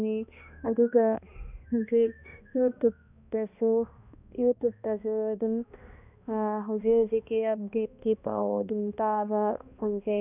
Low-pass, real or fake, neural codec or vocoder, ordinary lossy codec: 3.6 kHz; fake; codec, 16 kHz, 1 kbps, X-Codec, HuBERT features, trained on balanced general audio; none